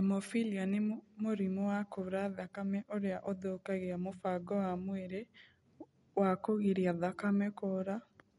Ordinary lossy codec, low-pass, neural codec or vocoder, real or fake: MP3, 48 kbps; 19.8 kHz; none; real